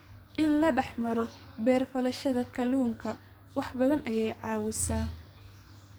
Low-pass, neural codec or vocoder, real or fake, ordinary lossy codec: none; codec, 44.1 kHz, 2.6 kbps, SNAC; fake; none